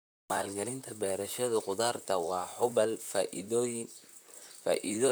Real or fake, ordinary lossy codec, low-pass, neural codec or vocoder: fake; none; none; vocoder, 44.1 kHz, 128 mel bands, Pupu-Vocoder